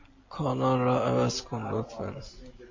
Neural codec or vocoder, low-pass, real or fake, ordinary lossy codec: none; 7.2 kHz; real; MP3, 32 kbps